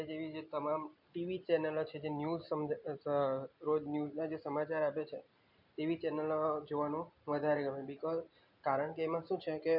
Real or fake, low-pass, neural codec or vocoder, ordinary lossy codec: real; 5.4 kHz; none; none